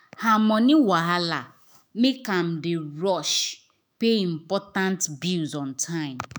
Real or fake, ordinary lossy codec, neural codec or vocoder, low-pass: fake; none; autoencoder, 48 kHz, 128 numbers a frame, DAC-VAE, trained on Japanese speech; none